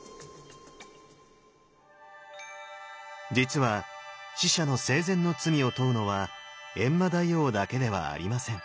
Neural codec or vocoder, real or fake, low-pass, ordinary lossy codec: none; real; none; none